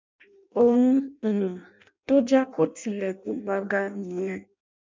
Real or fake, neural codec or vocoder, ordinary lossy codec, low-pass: fake; codec, 16 kHz in and 24 kHz out, 0.6 kbps, FireRedTTS-2 codec; MP3, 64 kbps; 7.2 kHz